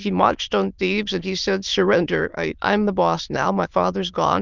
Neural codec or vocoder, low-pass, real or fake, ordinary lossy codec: autoencoder, 22.05 kHz, a latent of 192 numbers a frame, VITS, trained on many speakers; 7.2 kHz; fake; Opus, 32 kbps